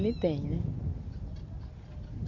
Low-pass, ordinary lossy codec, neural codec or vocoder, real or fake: 7.2 kHz; none; none; real